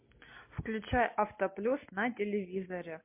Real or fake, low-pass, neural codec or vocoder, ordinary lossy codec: real; 3.6 kHz; none; MP3, 24 kbps